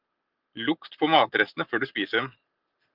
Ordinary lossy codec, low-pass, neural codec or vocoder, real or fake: Opus, 32 kbps; 5.4 kHz; none; real